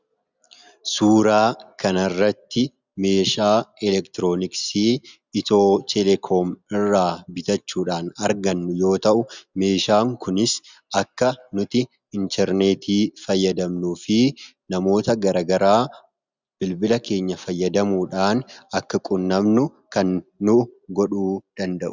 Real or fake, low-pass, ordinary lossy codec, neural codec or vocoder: real; 7.2 kHz; Opus, 64 kbps; none